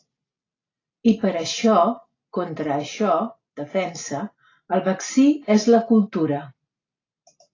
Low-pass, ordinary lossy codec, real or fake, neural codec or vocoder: 7.2 kHz; AAC, 32 kbps; real; none